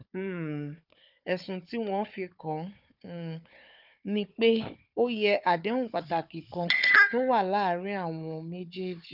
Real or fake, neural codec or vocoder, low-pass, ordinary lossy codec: fake; codec, 16 kHz, 4 kbps, FunCodec, trained on Chinese and English, 50 frames a second; 5.4 kHz; none